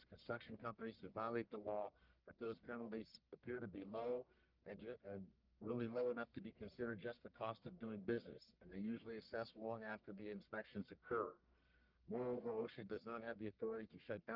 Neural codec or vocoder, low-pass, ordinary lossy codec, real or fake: codec, 44.1 kHz, 1.7 kbps, Pupu-Codec; 5.4 kHz; Opus, 24 kbps; fake